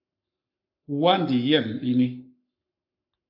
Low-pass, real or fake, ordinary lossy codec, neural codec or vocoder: 5.4 kHz; fake; AAC, 48 kbps; codec, 44.1 kHz, 7.8 kbps, Pupu-Codec